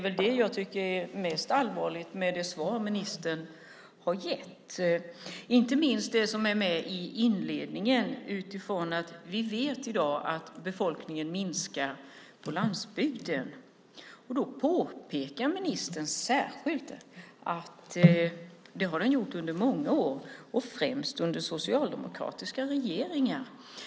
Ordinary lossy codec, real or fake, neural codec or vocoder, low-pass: none; real; none; none